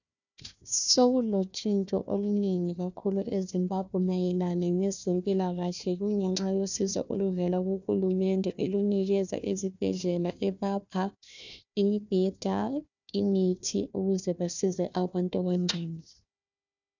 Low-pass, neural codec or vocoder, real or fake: 7.2 kHz; codec, 16 kHz, 1 kbps, FunCodec, trained on Chinese and English, 50 frames a second; fake